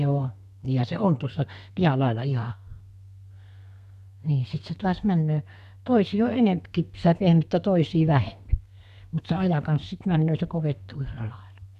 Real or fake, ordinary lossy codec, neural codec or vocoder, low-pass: fake; none; codec, 32 kHz, 1.9 kbps, SNAC; 14.4 kHz